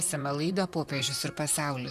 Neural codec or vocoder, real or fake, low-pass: vocoder, 44.1 kHz, 128 mel bands, Pupu-Vocoder; fake; 14.4 kHz